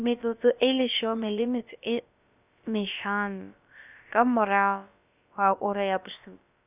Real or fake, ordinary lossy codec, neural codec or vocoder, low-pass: fake; none; codec, 16 kHz, about 1 kbps, DyCAST, with the encoder's durations; 3.6 kHz